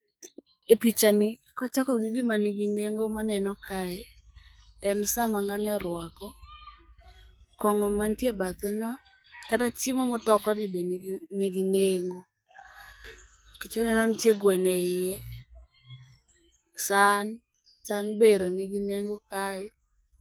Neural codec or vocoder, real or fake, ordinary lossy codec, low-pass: codec, 44.1 kHz, 2.6 kbps, SNAC; fake; none; none